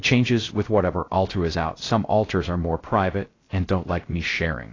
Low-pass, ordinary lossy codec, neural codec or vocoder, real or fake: 7.2 kHz; AAC, 32 kbps; codec, 16 kHz, 0.3 kbps, FocalCodec; fake